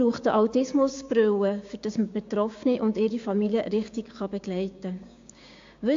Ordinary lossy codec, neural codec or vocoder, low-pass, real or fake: AAC, 48 kbps; codec, 16 kHz, 6 kbps, DAC; 7.2 kHz; fake